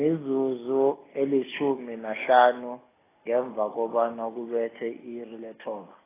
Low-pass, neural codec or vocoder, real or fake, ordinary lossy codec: 3.6 kHz; none; real; AAC, 16 kbps